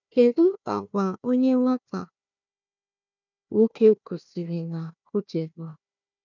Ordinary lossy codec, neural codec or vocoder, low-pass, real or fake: none; codec, 16 kHz, 1 kbps, FunCodec, trained on Chinese and English, 50 frames a second; 7.2 kHz; fake